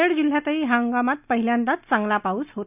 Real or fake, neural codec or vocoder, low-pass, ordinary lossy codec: real; none; 3.6 kHz; none